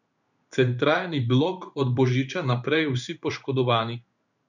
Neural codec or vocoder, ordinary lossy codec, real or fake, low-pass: codec, 16 kHz in and 24 kHz out, 1 kbps, XY-Tokenizer; none; fake; 7.2 kHz